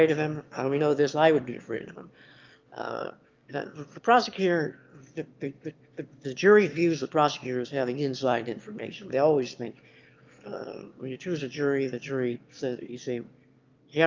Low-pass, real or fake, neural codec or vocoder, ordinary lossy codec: 7.2 kHz; fake; autoencoder, 22.05 kHz, a latent of 192 numbers a frame, VITS, trained on one speaker; Opus, 24 kbps